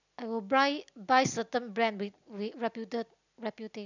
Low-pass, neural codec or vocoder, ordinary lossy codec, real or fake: 7.2 kHz; none; none; real